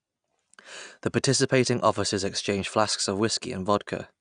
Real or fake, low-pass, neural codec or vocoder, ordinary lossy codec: real; 9.9 kHz; none; none